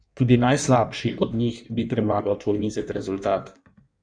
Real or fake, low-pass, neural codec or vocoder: fake; 9.9 kHz; codec, 16 kHz in and 24 kHz out, 1.1 kbps, FireRedTTS-2 codec